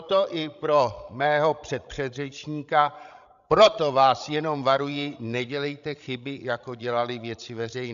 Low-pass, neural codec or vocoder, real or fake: 7.2 kHz; codec, 16 kHz, 16 kbps, FreqCodec, larger model; fake